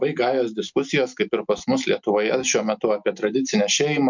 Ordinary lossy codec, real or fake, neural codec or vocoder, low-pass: MP3, 64 kbps; real; none; 7.2 kHz